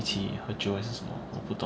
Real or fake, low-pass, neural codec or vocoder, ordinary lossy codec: real; none; none; none